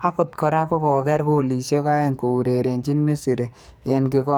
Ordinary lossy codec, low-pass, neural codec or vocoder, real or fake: none; none; codec, 44.1 kHz, 2.6 kbps, SNAC; fake